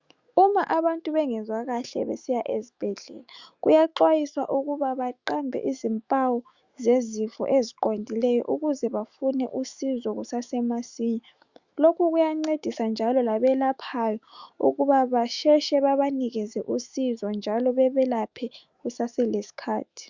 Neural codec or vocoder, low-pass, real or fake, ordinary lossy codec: autoencoder, 48 kHz, 128 numbers a frame, DAC-VAE, trained on Japanese speech; 7.2 kHz; fake; Opus, 64 kbps